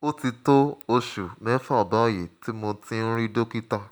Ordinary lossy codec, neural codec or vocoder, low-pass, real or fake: none; none; none; real